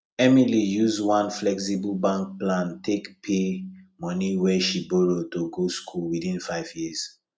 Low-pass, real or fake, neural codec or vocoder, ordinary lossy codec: none; real; none; none